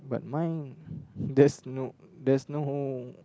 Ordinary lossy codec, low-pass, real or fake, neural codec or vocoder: none; none; real; none